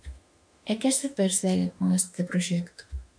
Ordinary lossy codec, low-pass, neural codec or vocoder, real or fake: MP3, 96 kbps; 9.9 kHz; autoencoder, 48 kHz, 32 numbers a frame, DAC-VAE, trained on Japanese speech; fake